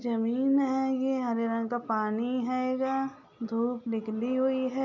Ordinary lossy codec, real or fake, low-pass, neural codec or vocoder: none; real; 7.2 kHz; none